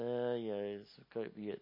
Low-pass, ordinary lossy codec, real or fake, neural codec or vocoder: 5.4 kHz; MP3, 24 kbps; real; none